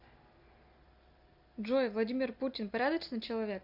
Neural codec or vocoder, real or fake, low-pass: none; real; 5.4 kHz